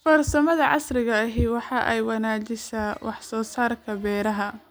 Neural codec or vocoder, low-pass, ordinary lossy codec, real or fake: none; none; none; real